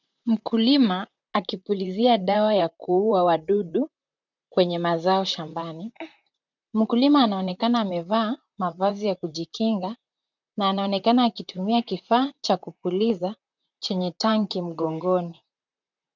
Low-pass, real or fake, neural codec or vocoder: 7.2 kHz; fake; vocoder, 44.1 kHz, 128 mel bands, Pupu-Vocoder